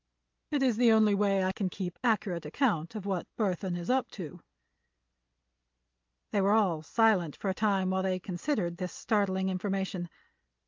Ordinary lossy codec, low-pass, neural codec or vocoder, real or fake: Opus, 24 kbps; 7.2 kHz; none; real